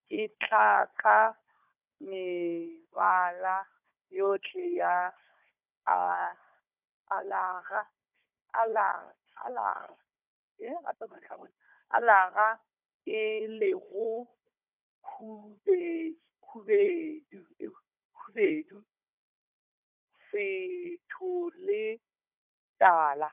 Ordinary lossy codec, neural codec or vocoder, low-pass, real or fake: none; codec, 16 kHz, 4 kbps, FunCodec, trained on Chinese and English, 50 frames a second; 3.6 kHz; fake